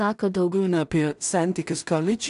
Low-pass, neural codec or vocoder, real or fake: 10.8 kHz; codec, 16 kHz in and 24 kHz out, 0.4 kbps, LongCat-Audio-Codec, two codebook decoder; fake